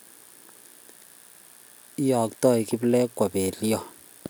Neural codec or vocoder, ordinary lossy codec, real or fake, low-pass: none; none; real; none